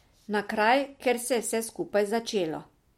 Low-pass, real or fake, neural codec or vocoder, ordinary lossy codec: 19.8 kHz; real; none; MP3, 64 kbps